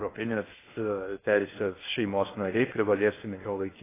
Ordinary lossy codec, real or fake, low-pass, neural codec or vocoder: AAC, 16 kbps; fake; 3.6 kHz; codec, 16 kHz in and 24 kHz out, 0.6 kbps, FocalCodec, streaming, 2048 codes